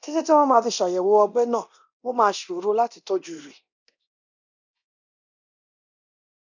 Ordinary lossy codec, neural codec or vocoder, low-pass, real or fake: none; codec, 24 kHz, 0.9 kbps, DualCodec; 7.2 kHz; fake